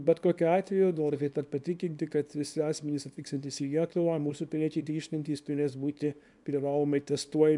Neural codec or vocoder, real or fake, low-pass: codec, 24 kHz, 0.9 kbps, WavTokenizer, small release; fake; 10.8 kHz